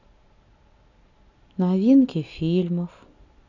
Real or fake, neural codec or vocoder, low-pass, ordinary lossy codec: real; none; 7.2 kHz; none